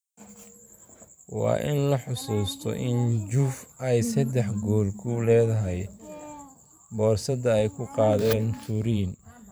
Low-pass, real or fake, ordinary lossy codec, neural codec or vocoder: none; fake; none; vocoder, 44.1 kHz, 128 mel bands every 512 samples, BigVGAN v2